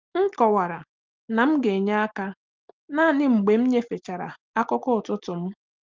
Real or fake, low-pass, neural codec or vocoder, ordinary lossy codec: real; 7.2 kHz; none; Opus, 32 kbps